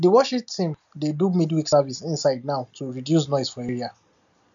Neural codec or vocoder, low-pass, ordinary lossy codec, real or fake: none; 7.2 kHz; none; real